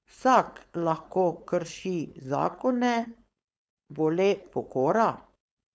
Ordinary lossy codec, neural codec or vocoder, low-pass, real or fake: none; codec, 16 kHz, 4.8 kbps, FACodec; none; fake